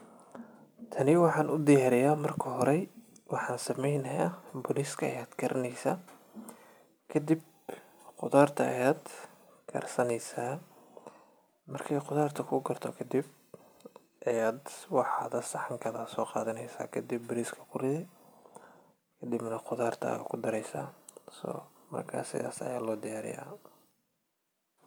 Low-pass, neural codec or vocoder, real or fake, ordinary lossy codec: none; none; real; none